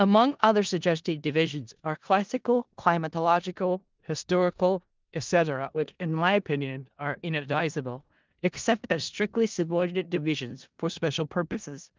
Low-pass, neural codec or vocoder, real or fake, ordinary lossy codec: 7.2 kHz; codec, 16 kHz in and 24 kHz out, 0.4 kbps, LongCat-Audio-Codec, four codebook decoder; fake; Opus, 32 kbps